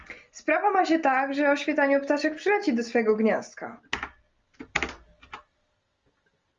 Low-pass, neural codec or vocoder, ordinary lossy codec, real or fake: 7.2 kHz; none; Opus, 32 kbps; real